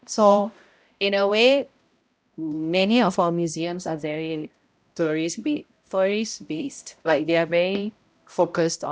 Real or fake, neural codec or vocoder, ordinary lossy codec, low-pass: fake; codec, 16 kHz, 0.5 kbps, X-Codec, HuBERT features, trained on balanced general audio; none; none